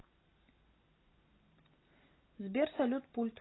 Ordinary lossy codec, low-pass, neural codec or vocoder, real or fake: AAC, 16 kbps; 7.2 kHz; none; real